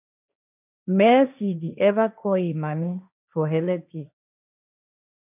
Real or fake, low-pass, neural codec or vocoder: fake; 3.6 kHz; codec, 16 kHz, 1.1 kbps, Voila-Tokenizer